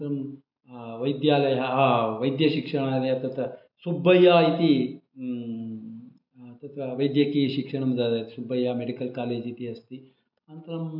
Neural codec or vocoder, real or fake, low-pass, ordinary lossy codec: none; real; 5.4 kHz; MP3, 48 kbps